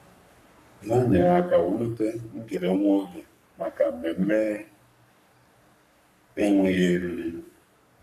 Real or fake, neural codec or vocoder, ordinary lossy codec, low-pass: fake; codec, 44.1 kHz, 3.4 kbps, Pupu-Codec; none; 14.4 kHz